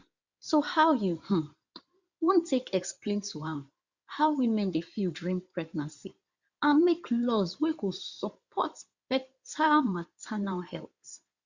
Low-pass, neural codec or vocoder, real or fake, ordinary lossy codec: 7.2 kHz; codec, 16 kHz in and 24 kHz out, 2.2 kbps, FireRedTTS-2 codec; fake; Opus, 64 kbps